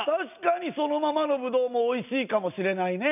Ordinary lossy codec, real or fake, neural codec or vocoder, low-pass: none; real; none; 3.6 kHz